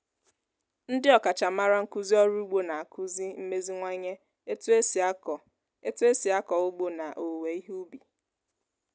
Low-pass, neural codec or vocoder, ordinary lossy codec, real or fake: none; none; none; real